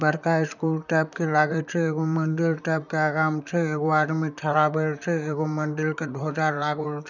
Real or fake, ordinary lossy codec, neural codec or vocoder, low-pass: fake; none; vocoder, 44.1 kHz, 128 mel bands, Pupu-Vocoder; 7.2 kHz